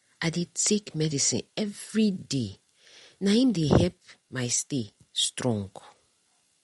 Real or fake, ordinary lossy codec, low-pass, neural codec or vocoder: real; MP3, 48 kbps; 19.8 kHz; none